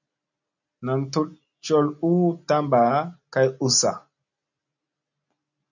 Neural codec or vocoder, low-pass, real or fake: none; 7.2 kHz; real